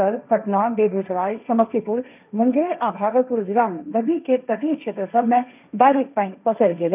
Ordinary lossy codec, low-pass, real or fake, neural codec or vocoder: none; 3.6 kHz; fake; codec, 16 kHz, 1.1 kbps, Voila-Tokenizer